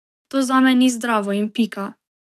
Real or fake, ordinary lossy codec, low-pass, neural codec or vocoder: fake; none; 14.4 kHz; codec, 44.1 kHz, 7.8 kbps, DAC